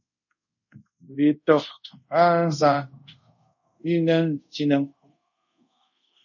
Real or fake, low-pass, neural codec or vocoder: fake; 7.2 kHz; codec, 24 kHz, 0.5 kbps, DualCodec